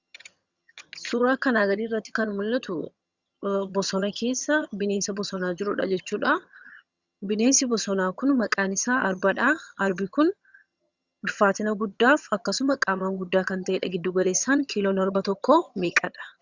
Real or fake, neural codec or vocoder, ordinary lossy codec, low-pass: fake; vocoder, 22.05 kHz, 80 mel bands, HiFi-GAN; Opus, 64 kbps; 7.2 kHz